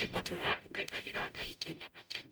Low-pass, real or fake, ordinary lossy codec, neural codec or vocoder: none; fake; none; codec, 44.1 kHz, 0.9 kbps, DAC